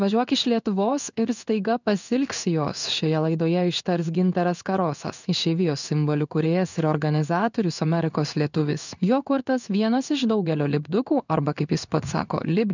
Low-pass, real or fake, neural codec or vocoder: 7.2 kHz; fake; codec, 16 kHz in and 24 kHz out, 1 kbps, XY-Tokenizer